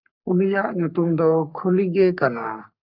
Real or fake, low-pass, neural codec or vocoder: fake; 5.4 kHz; codec, 44.1 kHz, 3.4 kbps, Pupu-Codec